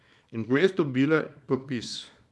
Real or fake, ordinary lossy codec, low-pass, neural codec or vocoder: fake; none; none; codec, 24 kHz, 0.9 kbps, WavTokenizer, small release